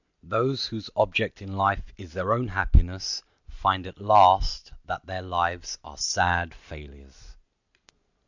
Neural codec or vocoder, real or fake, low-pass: none; real; 7.2 kHz